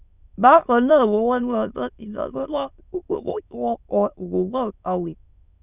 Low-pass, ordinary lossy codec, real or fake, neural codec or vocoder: 3.6 kHz; none; fake; autoencoder, 22.05 kHz, a latent of 192 numbers a frame, VITS, trained on many speakers